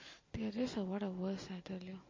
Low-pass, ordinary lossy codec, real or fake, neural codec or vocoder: 7.2 kHz; MP3, 32 kbps; real; none